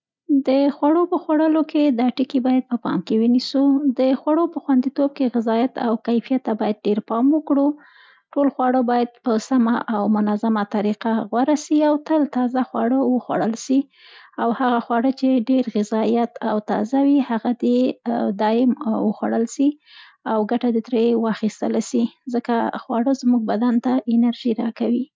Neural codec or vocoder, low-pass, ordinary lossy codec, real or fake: none; none; none; real